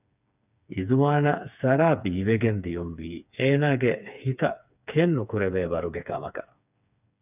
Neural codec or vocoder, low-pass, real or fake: codec, 16 kHz, 4 kbps, FreqCodec, smaller model; 3.6 kHz; fake